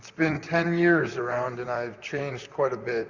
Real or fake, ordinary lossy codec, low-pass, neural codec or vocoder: fake; Opus, 64 kbps; 7.2 kHz; vocoder, 44.1 kHz, 128 mel bands, Pupu-Vocoder